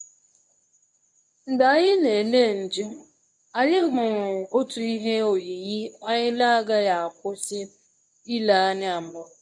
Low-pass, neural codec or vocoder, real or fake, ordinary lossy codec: none; codec, 24 kHz, 0.9 kbps, WavTokenizer, medium speech release version 1; fake; none